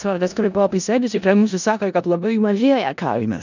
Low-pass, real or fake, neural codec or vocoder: 7.2 kHz; fake; codec, 16 kHz in and 24 kHz out, 0.4 kbps, LongCat-Audio-Codec, four codebook decoder